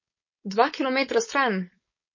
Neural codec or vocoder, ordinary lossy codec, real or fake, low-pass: codec, 16 kHz, 4.8 kbps, FACodec; MP3, 32 kbps; fake; 7.2 kHz